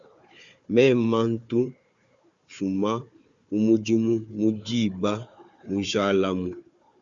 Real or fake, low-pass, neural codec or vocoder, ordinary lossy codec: fake; 7.2 kHz; codec, 16 kHz, 4 kbps, FunCodec, trained on Chinese and English, 50 frames a second; Opus, 64 kbps